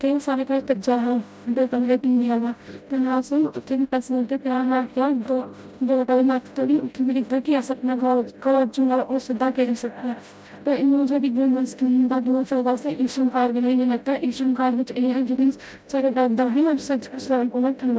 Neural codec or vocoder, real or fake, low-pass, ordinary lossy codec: codec, 16 kHz, 0.5 kbps, FreqCodec, smaller model; fake; none; none